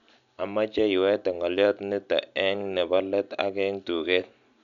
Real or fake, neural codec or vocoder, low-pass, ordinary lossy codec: real; none; 7.2 kHz; none